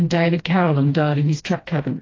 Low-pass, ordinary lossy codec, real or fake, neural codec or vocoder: 7.2 kHz; AAC, 32 kbps; fake; codec, 16 kHz, 1 kbps, FreqCodec, smaller model